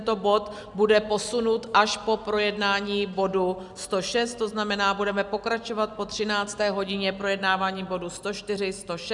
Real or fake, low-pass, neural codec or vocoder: real; 10.8 kHz; none